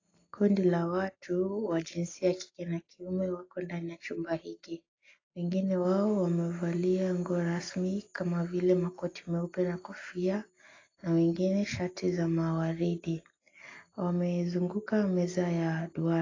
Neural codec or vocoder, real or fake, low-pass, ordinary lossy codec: none; real; 7.2 kHz; AAC, 32 kbps